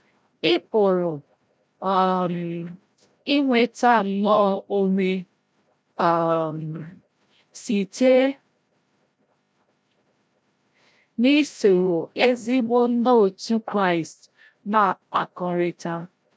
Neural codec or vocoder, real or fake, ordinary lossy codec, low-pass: codec, 16 kHz, 0.5 kbps, FreqCodec, larger model; fake; none; none